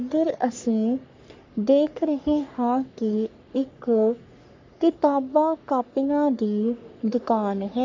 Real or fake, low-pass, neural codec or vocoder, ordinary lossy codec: fake; 7.2 kHz; codec, 44.1 kHz, 3.4 kbps, Pupu-Codec; MP3, 64 kbps